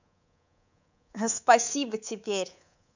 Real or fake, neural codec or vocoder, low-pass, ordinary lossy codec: fake; codec, 24 kHz, 3.1 kbps, DualCodec; 7.2 kHz; none